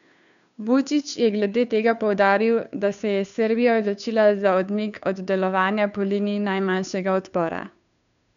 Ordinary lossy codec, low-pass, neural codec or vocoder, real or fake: none; 7.2 kHz; codec, 16 kHz, 2 kbps, FunCodec, trained on Chinese and English, 25 frames a second; fake